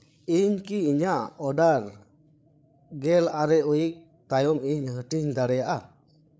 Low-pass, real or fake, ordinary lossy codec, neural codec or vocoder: none; fake; none; codec, 16 kHz, 8 kbps, FreqCodec, larger model